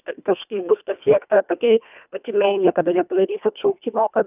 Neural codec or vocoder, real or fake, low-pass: codec, 24 kHz, 1.5 kbps, HILCodec; fake; 3.6 kHz